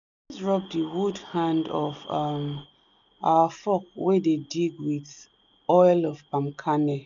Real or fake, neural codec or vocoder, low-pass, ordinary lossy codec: real; none; 7.2 kHz; none